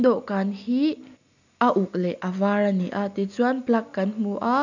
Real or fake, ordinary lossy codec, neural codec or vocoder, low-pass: real; none; none; 7.2 kHz